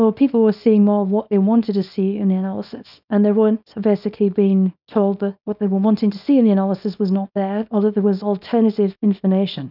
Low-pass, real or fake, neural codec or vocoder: 5.4 kHz; fake; codec, 24 kHz, 0.9 kbps, WavTokenizer, small release